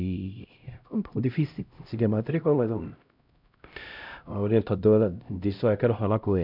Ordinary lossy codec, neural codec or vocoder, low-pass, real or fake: none; codec, 16 kHz, 0.5 kbps, X-Codec, HuBERT features, trained on LibriSpeech; 5.4 kHz; fake